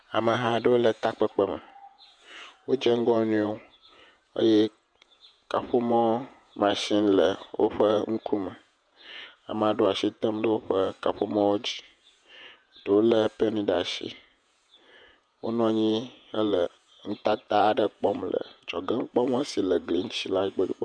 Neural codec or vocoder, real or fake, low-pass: vocoder, 48 kHz, 128 mel bands, Vocos; fake; 9.9 kHz